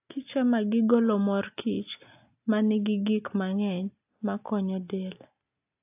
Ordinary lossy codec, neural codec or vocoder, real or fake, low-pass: AAC, 32 kbps; none; real; 3.6 kHz